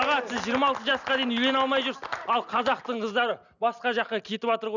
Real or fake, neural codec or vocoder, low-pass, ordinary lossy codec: real; none; 7.2 kHz; none